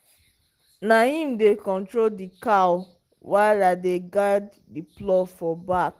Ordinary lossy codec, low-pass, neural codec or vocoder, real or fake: Opus, 16 kbps; 14.4 kHz; none; real